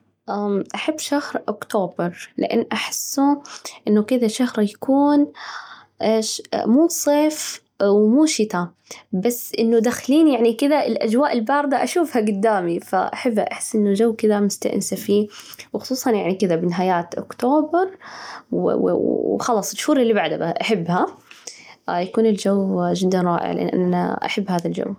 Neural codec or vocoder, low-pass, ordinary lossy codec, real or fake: none; 19.8 kHz; none; real